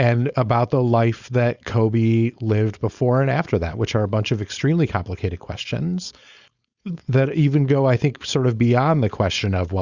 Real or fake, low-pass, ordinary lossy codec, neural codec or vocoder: fake; 7.2 kHz; Opus, 64 kbps; codec, 16 kHz, 4.8 kbps, FACodec